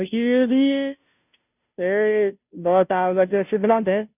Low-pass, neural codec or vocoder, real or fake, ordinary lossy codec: 3.6 kHz; codec, 16 kHz, 0.5 kbps, FunCodec, trained on Chinese and English, 25 frames a second; fake; none